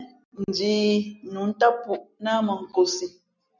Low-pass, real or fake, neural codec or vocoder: 7.2 kHz; real; none